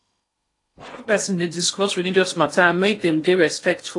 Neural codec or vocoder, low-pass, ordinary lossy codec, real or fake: codec, 16 kHz in and 24 kHz out, 0.8 kbps, FocalCodec, streaming, 65536 codes; 10.8 kHz; AAC, 48 kbps; fake